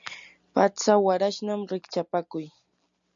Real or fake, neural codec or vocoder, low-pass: real; none; 7.2 kHz